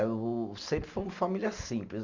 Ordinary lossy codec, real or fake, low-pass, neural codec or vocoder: Opus, 64 kbps; real; 7.2 kHz; none